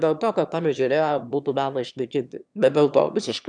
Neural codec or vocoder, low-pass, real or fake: autoencoder, 22.05 kHz, a latent of 192 numbers a frame, VITS, trained on one speaker; 9.9 kHz; fake